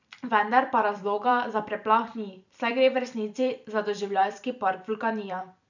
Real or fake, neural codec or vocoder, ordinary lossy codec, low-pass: fake; vocoder, 44.1 kHz, 128 mel bands every 256 samples, BigVGAN v2; none; 7.2 kHz